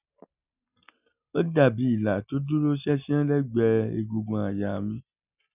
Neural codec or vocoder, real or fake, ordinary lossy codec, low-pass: none; real; none; 3.6 kHz